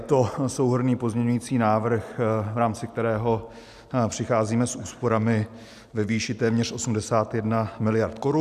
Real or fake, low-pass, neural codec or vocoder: real; 14.4 kHz; none